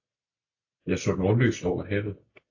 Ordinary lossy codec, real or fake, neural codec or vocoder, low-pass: AAC, 48 kbps; real; none; 7.2 kHz